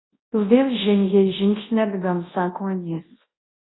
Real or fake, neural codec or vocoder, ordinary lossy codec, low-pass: fake; codec, 24 kHz, 0.9 kbps, WavTokenizer, large speech release; AAC, 16 kbps; 7.2 kHz